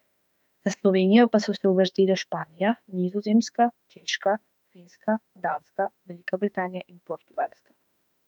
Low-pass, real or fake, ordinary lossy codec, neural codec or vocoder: 19.8 kHz; fake; none; autoencoder, 48 kHz, 32 numbers a frame, DAC-VAE, trained on Japanese speech